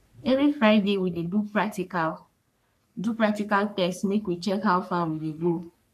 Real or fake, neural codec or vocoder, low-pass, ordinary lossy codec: fake; codec, 44.1 kHz, 3.4 kbps, Pupu-Codec; 14.4 kHz; none